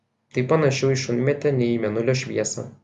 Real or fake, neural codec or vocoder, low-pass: real; none; 10.8 kHz